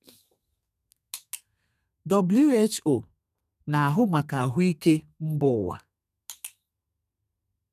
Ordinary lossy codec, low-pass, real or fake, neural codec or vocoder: none; 14.4 kHz; fake; codec, 32 kHz, 1.9 kbps, SNAC